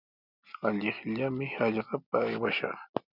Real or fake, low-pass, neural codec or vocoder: real; 5.4 kHz; none